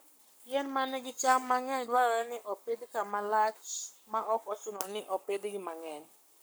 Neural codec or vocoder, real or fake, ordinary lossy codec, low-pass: codec, 44.1 kHz, 7.8 kbps, Pupu-Codec; fake; none; none